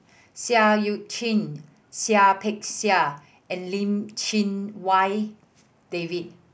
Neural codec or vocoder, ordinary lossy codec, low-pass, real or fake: none; none; none; real